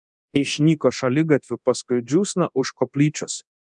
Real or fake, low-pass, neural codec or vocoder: fake; 10.8 kHz; codec, 24 kHz, 0.9 kbps, DualCodec